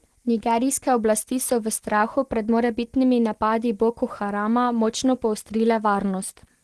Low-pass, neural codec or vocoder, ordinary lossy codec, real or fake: 10.8 kHz; none; Opus, 16 kbps; real